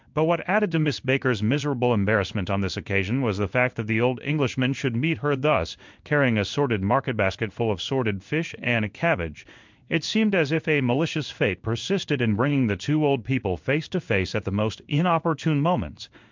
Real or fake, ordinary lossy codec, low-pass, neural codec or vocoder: fake; MP3, 64 kbps; 7.2 kHz; codec, 16 kHz in and 24 kHz out, 1 kbps, XY-Tokenizer